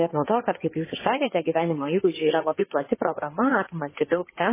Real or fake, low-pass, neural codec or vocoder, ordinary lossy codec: fake; 3.6 kHz; codec, 16 kHz in and 24 kHz out, 2.2 kbps, FireRedTTS-2 codec; MP3, 16 kbps